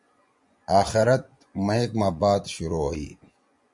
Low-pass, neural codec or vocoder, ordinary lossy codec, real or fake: 10.8 kHz; vocoder, 24 kHz, 100 mel bands, Vocos; MP3, 64 kbps; fake